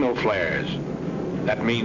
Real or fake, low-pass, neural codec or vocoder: real; 7.2 kHz; none